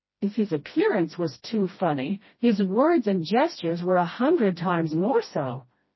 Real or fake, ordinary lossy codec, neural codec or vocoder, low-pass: fake; MP3, 24 kbps; codec, 16 kHz, 1 kbps, FreqCodec, smaller model; 7.2 kHz